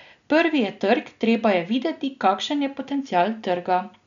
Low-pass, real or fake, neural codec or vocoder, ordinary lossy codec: 7.2 kHz; real; none; none